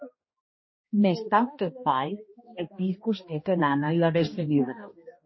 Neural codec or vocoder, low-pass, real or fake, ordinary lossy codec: codec, 16 kHz, 1 kbps, X-Codec, HuBERT features, trained on balanced general audio; 7.2 kHz; fake; MP3, 24 kbps